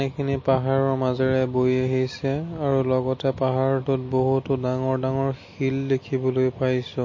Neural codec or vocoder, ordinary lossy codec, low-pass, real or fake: none; MP3, 32 kbps; 7.2 kHz; real